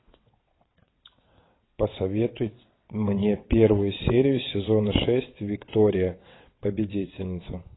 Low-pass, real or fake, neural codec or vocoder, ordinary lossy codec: 7.2 kHz; fake; vocoder, 22.05 kHz, 80 mel bands, WaveNeXt; AAC, 16 kbps